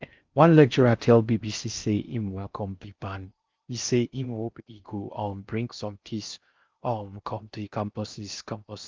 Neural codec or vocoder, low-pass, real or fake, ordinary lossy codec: codec, 16 kHz in and 24 kHz out, 0.6 kbps, FocalCodec, streaming, 4096 codes; 7.2 kHz; fake; Opus, 24 kbps